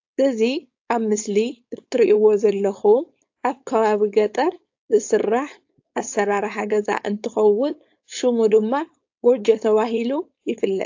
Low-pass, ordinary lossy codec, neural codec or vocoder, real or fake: 7.2 kHz; AAC, 48 kbps; codec, 16 kHz, 4.8 kbps, FACodec; fake